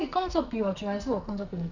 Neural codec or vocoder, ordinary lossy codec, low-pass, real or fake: codec, 44.1 kHz, 2.6 kbps, SNAC; none; 7.2 kHz; fake